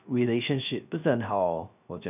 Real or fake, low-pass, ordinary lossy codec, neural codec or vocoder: fake; 3.6 kHz; none; codec, 16 kHz, 0.3 kbps, FocalCodec